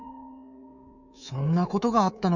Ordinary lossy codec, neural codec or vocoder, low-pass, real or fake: none; codec, 24 kHz, 3.1 kbps, DualCodec; 7.2 kHz; fake